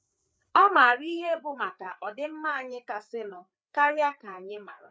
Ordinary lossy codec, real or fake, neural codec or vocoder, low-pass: none; fake; codec, 16 kHz, 4 kbps, FreqCodec, larger model; none